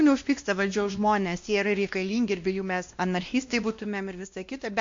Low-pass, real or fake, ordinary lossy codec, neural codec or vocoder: 7.2 kHz; fake; MP3, 48 kbps; codec, 16 kHz, 1 kbps, X-Codec, WavLM features, trained on Multilingual LibriSpeech